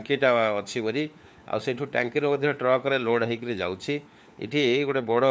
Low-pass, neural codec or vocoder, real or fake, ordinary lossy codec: none; codec, 16 kHz, 4 kbps, FunCodec, trained on LibriTTS, 50 frames a second; fake; none